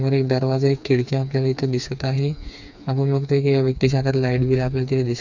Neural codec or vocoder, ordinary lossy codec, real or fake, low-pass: codec, 16 kHz, 4 kbps, FreqCodec, smaller model; none; fake; 7.2 kHz